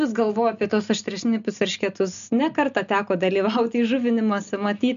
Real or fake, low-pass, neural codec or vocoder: real; 7.2 kHz; none